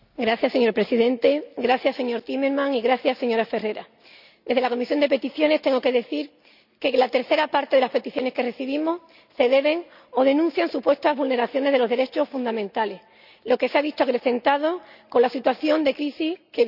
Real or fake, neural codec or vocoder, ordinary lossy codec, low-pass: real; none; none; 5.4 kHz